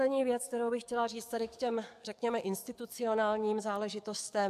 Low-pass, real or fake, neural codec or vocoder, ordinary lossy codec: 14.4 kHz; fake; codec, 44.1 kHz, 7.8 kbps, DAC; MP3, 96 kbps